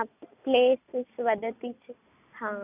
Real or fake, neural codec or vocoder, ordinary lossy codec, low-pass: real; none; none; 3.6 kHz